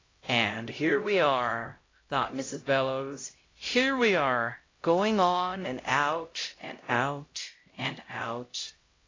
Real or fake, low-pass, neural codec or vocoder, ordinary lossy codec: fake; 7.2 kHz; codec, 16 kHz, 0.5 kbps, X-Codec, HuBERT features, trained on LibriSpeech; AAC, 32 kbps